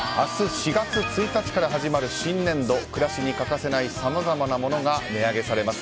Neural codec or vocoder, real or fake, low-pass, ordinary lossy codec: none; real; none; none